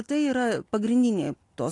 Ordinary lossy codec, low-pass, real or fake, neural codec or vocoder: AAC, 48 kbps; 10.8 kHz; real; none